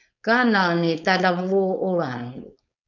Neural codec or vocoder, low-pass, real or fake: codec, 16 kHz, 4.8 kbps, FACodec; 7.2 kHz; fake